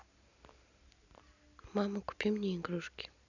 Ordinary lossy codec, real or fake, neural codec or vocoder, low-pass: none; real; none; 7.2 kHz